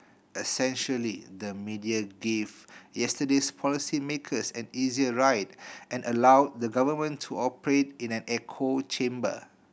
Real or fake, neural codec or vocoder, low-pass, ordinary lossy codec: real; none; none; none